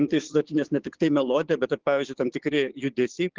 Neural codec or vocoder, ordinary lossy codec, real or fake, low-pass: codec, 16 kHz, 6 kbps, DAC; Opus, 16 kbps; fake; 7.2 kHz